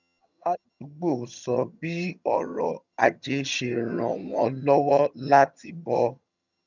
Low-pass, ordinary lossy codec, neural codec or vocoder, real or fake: 7.2 kHz; none; vocoder, 22.05 kHz, 80 mel bands, HiFi-GAN; fake